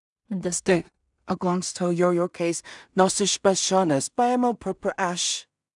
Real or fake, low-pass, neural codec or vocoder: fake; 10.8 kHz; codec, 16 kHz in and 24 kHz out, 0.4 kbps, LongCat-Audio-Codec, two codebook decoder